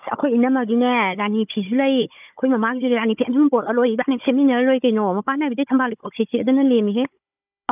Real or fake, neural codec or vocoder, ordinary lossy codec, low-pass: fake; codec, 16 kHz, 16 kbps, FunCodec, trained on Chinese and English, 50 frames a second; none; 3.6 kHz